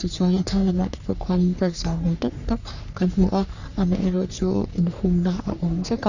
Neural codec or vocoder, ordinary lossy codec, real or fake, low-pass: codec, 44.1 kHz, 3.4 kbps, Pupu-Codec; none; fake; 7.2 kHz